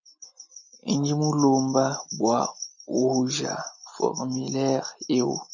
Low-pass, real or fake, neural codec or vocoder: 7.2 kHz; real; none